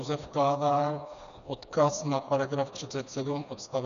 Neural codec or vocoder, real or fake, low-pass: codec, 16 kHz, 2 kbps, FreqCodec, smaller model; fake; 7.2 kHz